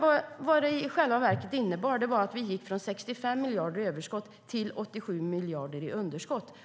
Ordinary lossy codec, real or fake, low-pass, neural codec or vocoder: none; real; none; none